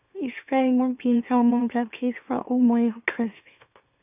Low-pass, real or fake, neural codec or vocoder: 3.6 kHz; fake; autoencoder, 44.1 kHz, a latent of 192 numbers a frame, MeloTTS